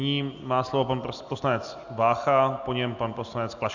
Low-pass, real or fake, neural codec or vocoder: 7.2 kHz; real; none